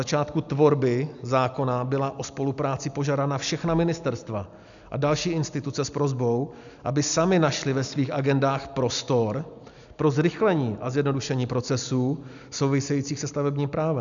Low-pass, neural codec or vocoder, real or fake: 7.2 kHz; none; real